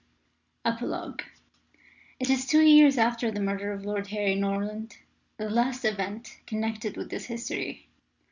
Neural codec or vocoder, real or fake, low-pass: none; real; 7.2 kHz